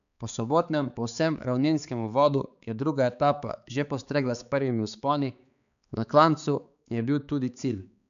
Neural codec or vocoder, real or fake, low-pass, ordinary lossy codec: codec, 16 kHz, 4 kbps, X-Codec, HuBERT features, trained on balanced general audio; fake; 7.2 kHz; none